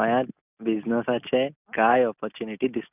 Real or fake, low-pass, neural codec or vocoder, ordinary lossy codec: real; 3.6 kHz; none; none